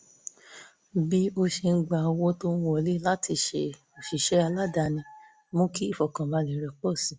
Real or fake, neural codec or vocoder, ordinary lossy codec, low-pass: real; none; none; none